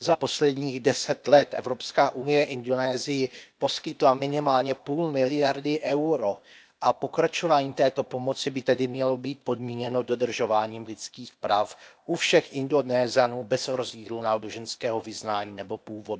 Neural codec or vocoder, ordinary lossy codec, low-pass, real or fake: codec, 16 kHz, 0.8 kbps, ZipCodec; none; none; fake